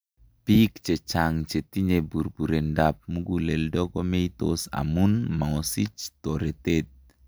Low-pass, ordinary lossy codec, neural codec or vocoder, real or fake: none; none; none; real